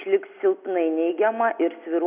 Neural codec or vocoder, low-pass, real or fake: none; 3.6 kHz; real